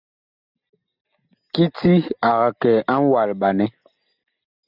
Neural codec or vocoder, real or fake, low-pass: none; real; 5.4 kHz